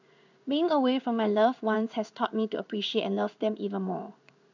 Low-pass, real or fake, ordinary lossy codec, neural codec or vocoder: 7.2 kHz; fake; none; codec, 16 kHz in and 24 kHz out, 1 kbps, XY-Tokenizer